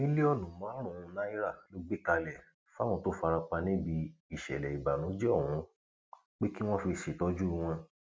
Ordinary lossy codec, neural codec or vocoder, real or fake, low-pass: none; none; real; none